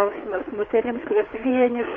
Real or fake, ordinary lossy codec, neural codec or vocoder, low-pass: fake; MP3, 48 kbps; codec, 16 kHz, 4 kbps, FreqCodec, larger model; 7.2 kHz